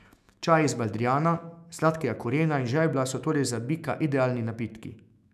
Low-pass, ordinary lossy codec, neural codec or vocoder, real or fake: 14.4 kHz; none; autoencoder, 48 kHz, 128 numbers a frame, DAC-VAE, trained on Japanese speech; fake